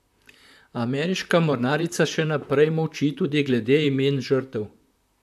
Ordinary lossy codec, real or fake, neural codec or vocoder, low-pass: AAC, 96 kbps; fake; vocoder, 44.1 kHz, 128 mel bands, Pupu-Vocoder; 14.4 kHz